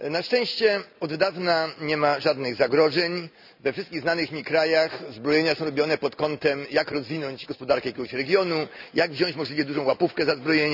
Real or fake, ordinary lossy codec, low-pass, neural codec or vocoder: real; none; 5.4 kHz; none